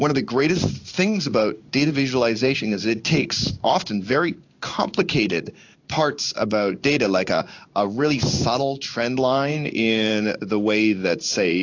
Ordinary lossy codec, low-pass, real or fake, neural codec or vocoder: AAC, 48 kbps; 7.2 kHz; fake; codec, 16 kHz in and 24 kHz out, 1 kbps, XY-Tokenizer